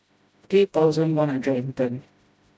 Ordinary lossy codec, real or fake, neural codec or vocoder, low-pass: none; fake; codec, 16 kHz, 0.5 kbps, FreqCodec, smaller model; none